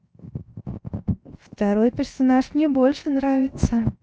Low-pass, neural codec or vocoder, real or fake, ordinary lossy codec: none; codec, 16 kHz, 0.7 kbps, FocalCodec; fake; none